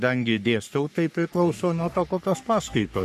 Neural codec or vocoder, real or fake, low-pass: codec, 44.1 kHz, 3.4 kbps, Pupu-Codec; fake; 14.4 kHz